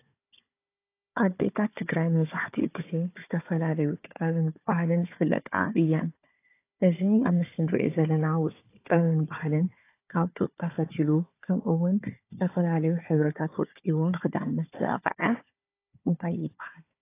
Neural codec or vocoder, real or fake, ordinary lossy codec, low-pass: codec, 16 kHz, 4 kbps, FunCodec, trained on Chinese and English, 50 frames a second; fake; AAC, 24 kbps; 3.6 kHz